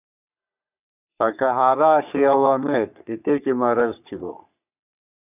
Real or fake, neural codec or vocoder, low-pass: fake; codec, 44.1 kHz, 3.4 kbps, Pupu-Codec; 3.6 kHz